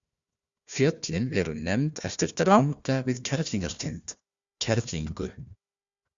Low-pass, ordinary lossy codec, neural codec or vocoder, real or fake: 7.2 kHz; Opus, 64 kbps; codec, 16 kHz, 1 kbps, FunCodec, trained on Chinese and English, 50 frames a second; fake